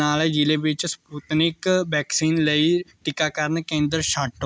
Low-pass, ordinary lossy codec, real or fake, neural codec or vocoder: none; none; real; none